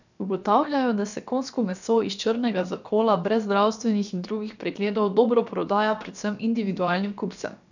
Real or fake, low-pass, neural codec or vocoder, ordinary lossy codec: fake; 7.2 kHz; codec, 16 kHz, about 1 kbps, DyCAST, with the encoder's durations; none